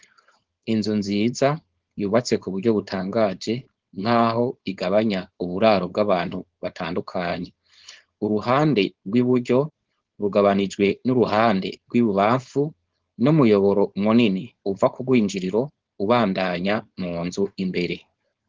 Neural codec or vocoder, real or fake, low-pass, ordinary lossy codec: codec, 16 kHz, 4.8 kbps, FACodec; fake; 7.2 kHz; Opus, 16 kbps